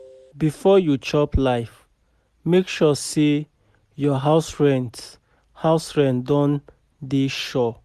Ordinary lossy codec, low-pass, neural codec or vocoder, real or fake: Opus, 64 kbps; 14.4 kHz; none; real